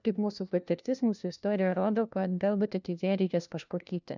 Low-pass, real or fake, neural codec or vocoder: 7.2 kHz; fake; codec, 16 kHz, 1 kbps, FunCodec, trained on LibriTTS, 50 frames a second